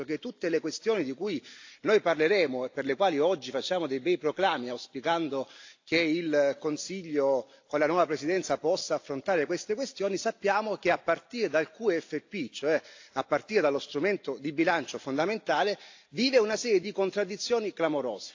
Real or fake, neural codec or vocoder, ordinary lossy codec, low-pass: real; none; AAC, 48 kbps; 7.2 kHz